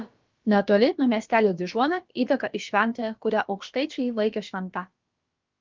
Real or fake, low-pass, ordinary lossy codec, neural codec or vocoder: fake; 7.2 kHz; Opus, 32 kbps; codec, 16 kHz, about 1 kbps, DyCAST, with the encoder's durations